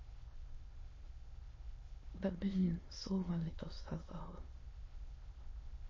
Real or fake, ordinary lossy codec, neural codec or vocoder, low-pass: fake; MP3, 32 kbps; autoencoder, 22.05 kHz, a latent of 192 numbers a frame, VITS, trained on many speakers; 7.2 kHz